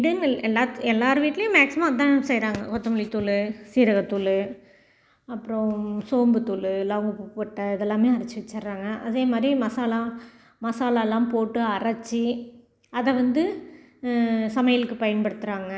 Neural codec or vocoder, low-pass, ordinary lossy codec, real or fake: none; none; none; real